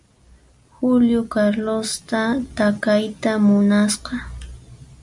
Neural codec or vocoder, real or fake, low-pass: none; real; 10.8 kHz